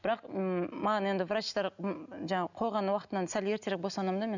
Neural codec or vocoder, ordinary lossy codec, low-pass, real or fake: none; none; 7.2 kHz; real